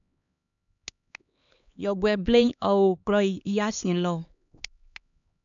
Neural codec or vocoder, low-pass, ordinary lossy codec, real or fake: codec, 16 kHz, 2 kbps, X-Codec, HuBERT features, trained on LibriSpeech; 7.2 kHz; none; fake